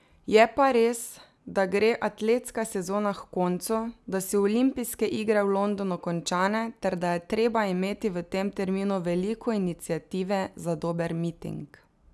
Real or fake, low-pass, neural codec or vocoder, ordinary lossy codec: real; none; none; none